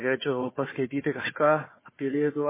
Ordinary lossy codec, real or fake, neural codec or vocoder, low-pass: MP3, 16 kbps; fake; codec, 16 kHz, 4 kbps, FunCodec, trained on Chinese and English, 50 frames a second; 3.6 kHz